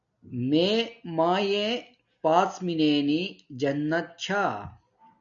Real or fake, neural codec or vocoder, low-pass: real; none; 7.2 kHz